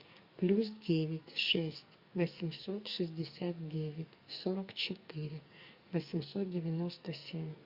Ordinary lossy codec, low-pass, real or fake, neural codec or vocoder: Opus, 64 kbps; 5.4 kHz; fake; codec, 44.1 kHz, 2.6 kbps, SNAC